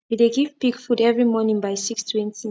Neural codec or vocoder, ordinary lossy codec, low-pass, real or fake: none; none; none; real